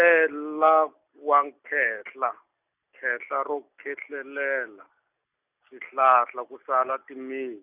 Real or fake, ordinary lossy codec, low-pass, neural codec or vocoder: real; none; 3.6 kHz; none